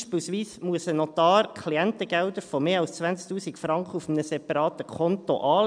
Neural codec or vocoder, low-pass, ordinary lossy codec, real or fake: none; 9.9 kHz; none; real